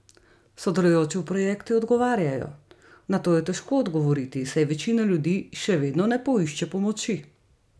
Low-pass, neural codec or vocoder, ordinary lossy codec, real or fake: none; none; none; real